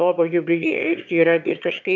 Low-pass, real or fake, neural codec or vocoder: 7.2 kHz; fake; autoencoder, 22.05 kHz, a latent of 192 numbers a frame, VITS, trained on one speaker